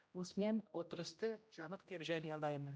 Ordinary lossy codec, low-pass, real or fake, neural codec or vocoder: none; none; fake; codec, 16 kHz, 0.5 kbps, X-Codec, HuBERT features, trained on general audio